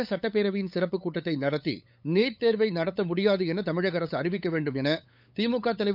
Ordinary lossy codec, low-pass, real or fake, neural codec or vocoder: none; 5.4 kHz; fake; codec, 16 kHz, 8 kbps, FunCodec, trained on LibriTTS, 25 frames a second